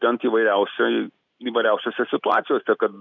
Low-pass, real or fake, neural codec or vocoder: 7.2 kHz; real; none